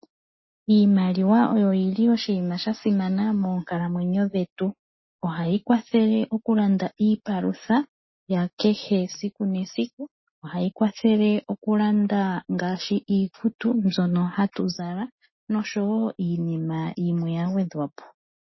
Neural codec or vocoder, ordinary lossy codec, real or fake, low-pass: none; MP3, 24 kbps; real; 7.2 kHz